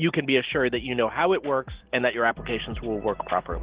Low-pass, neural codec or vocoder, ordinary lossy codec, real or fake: 3.6 kHz; none; Opus, 16 kbps; real